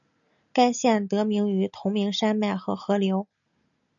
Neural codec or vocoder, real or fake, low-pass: none; real; 7.2 kHz